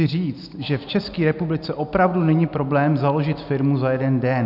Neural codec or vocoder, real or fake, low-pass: none; real; 5.4 kHz